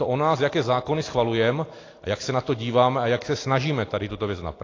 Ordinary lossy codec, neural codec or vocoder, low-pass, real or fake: AAC, 32 kbps; none; 7.2 kHz; real